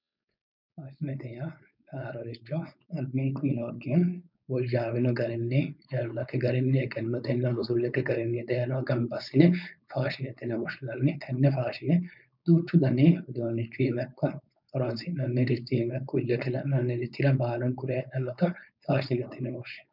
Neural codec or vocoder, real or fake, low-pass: codec, 16 kHz, 4.8 kbps, FACodec; fake; 5.4 kHz